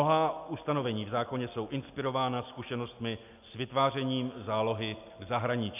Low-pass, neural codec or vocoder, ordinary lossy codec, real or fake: 3.6 kHz; none; AAC, 32 kbps; real